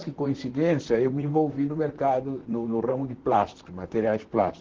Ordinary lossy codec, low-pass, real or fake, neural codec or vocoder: Opus, 16 kbps; 7.2 kHz; fake; vocoder, 44.1 kHz, 128 mel bands, Pupu-Vocoder